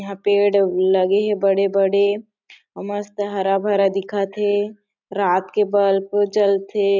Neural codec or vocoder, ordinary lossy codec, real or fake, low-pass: none; none; real; 7.2 kHz